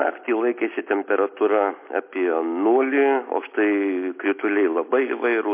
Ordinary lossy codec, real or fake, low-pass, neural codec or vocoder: MP3, 24 kbps; real; 3.6 kHz; none